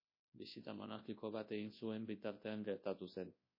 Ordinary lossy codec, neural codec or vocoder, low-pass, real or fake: MP3, 24 kbps; codec, 24 kHz, 0.9 kbps, WavTokenizer, large speech release; 5.4 kHz; fake